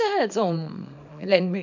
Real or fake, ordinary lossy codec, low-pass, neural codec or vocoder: fake; none; 7.2 kHz; codec, 24 kHz, 0.9 kbps, WavTokenizer, small release